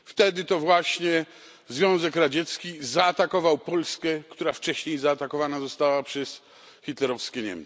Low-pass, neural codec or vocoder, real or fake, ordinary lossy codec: none; none; real; none